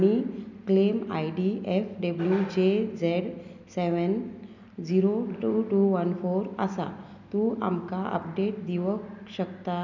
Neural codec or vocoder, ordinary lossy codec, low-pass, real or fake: none; none; 7.2 kHz; real